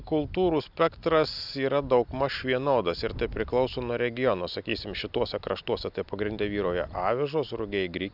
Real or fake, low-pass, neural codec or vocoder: real; 5.4 kHz; none